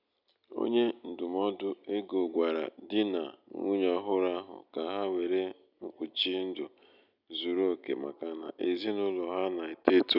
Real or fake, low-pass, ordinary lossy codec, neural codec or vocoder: real; 5.4 kHz; none; none